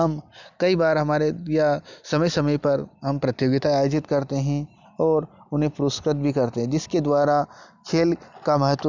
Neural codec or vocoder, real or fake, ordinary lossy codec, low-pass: none; real; none; 7.2 kHz